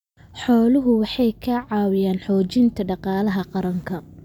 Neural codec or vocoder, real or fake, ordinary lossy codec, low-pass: none; real; none; 19.8 kHz